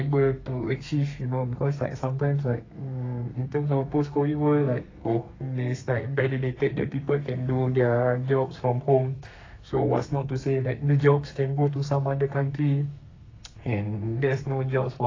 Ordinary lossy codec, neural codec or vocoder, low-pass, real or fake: AAC, 32 kbps; codec, 32 kHz, 1.9 kbps, SNAC; 7.2 kHz; fake